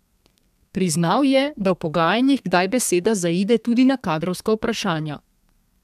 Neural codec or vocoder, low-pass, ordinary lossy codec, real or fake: codec, 32 kHz, 1.9 kbps, SNAC; 14.4 kHz; none; fake